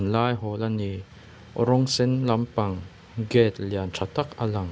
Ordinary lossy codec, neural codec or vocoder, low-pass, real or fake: none; none; none; real